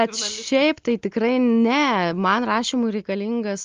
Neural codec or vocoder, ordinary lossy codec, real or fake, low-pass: none; Opus, 32 kbps; real; 7.2 kHz